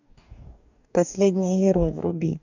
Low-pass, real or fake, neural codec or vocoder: 7.2 kHz; fake; codec, 44.1 kHz, 2.6 kbps, DAC